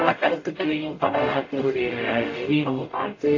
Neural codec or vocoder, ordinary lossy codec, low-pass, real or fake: codec, 44.1 kHz, 0.9 kbps, DAC; AAC, 32 kbps; 7.2 kHz; fake